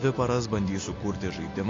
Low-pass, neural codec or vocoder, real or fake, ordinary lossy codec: 7.2 kHz; none; real; AAC, 32 kbps